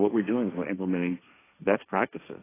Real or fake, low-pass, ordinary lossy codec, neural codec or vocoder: fake; 3.6 kHz; AAC, 16 kbps; codec, 16 kHz, 1.1 kbps, Voila-Tokenizer